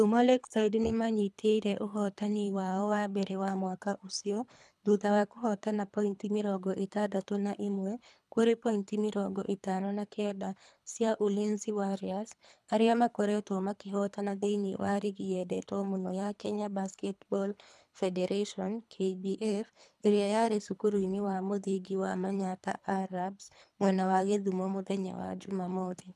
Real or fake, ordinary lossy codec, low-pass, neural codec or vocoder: fake; none; none; codec, 24 kHz, 3 kbps, HILCodec